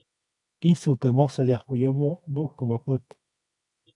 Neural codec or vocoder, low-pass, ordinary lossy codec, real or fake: codec, 24 kHz, 0.9 kbps, WavTokenizer, medium music audio release; 10.8 kHz; AAC, 64 kbps; fake